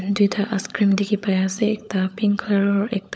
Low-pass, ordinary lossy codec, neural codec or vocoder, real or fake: none; none; codec, 16 kHz, 16 kbps, FunCodec, trained on LibriTTS, 50 frames a second; fake